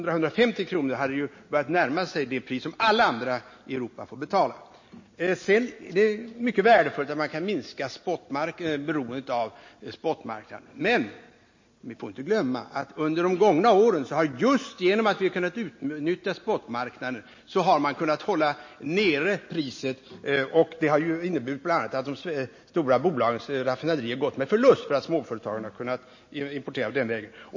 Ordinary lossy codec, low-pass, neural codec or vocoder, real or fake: MP3, 32 kbps; 7.2 kHz; none; real